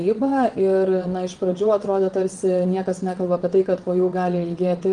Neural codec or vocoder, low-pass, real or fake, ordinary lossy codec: vocoder, 22.05 kHz, 80 mel bands, WaveNeXt; 9.9 kHz; fake; Opus, 24 kbps